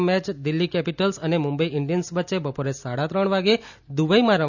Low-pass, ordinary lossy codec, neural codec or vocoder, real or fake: 7.2 kHz; none; none; real